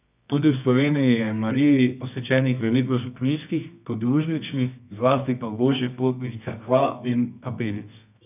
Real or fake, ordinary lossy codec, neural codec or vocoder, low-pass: fake; none; codec, 24 kHz, 0.9 kbps, WavTokenizer, medium music audio release; 3.6 kHz